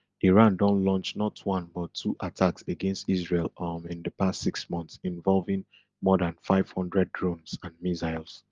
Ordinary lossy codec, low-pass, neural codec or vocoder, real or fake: Opus, 32 kbps; 7.2 kHz; none; real